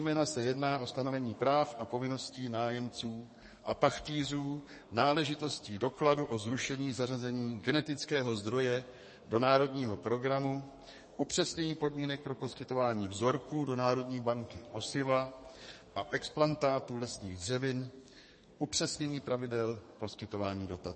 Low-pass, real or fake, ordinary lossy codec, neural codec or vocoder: 10.8 kHz; fake; MP3, 32 kbps; codec, 32 kHz, 1.9 kbps, SNAC